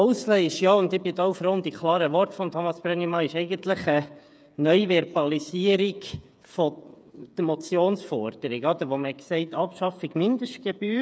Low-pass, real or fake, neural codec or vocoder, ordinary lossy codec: none; fake; codec, 16 kHz, 8 kbps, FreqCodec, smaller model; none